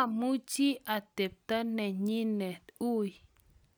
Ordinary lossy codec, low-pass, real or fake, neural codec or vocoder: none; none; real; none